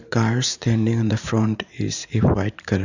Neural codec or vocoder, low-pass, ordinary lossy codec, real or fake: vocoder, 44.1 kHz, 128 mel bands every 512 samples, BigVGAN v2; 7.2 kHz; none; fake